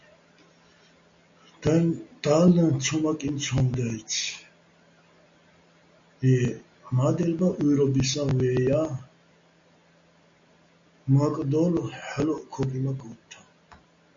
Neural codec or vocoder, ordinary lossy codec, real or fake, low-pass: none; MP3, 48 kbps; real; 7.2 kHz